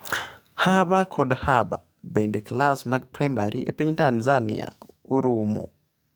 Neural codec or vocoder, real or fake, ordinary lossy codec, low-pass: codec, 44.1 kHz, 2.6 kbps, SNAC; fake; none; none